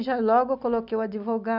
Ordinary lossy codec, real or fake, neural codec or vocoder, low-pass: none; real; none; 5.4 kHz